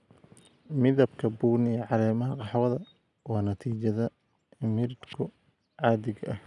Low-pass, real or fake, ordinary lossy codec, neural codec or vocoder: 10.8 kHz; real; none; none